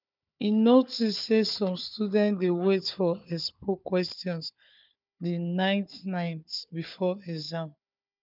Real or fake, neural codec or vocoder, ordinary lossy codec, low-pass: fake; codec, 16 kHz, 4 kbps, FunCodec, trained on Chinese and English, 50 frames a second; none; 5.4 kHz